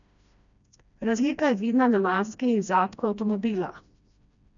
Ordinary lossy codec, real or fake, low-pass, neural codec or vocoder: none; fake; 7.2 kHz; codec, 16 kHz, 1 kbps, FreqCodec, smaller model